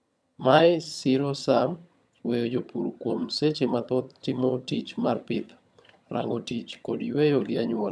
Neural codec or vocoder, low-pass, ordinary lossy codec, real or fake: vocoder, 22.05 kHz, 80 mel bands, HiFi-GAN; none; none; fake